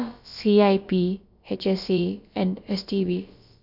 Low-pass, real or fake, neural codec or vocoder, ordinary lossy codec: 5.4 kHz; fake; codec, 16 kHz, about 1 kbps, DyCAST, with the encoder's durations; none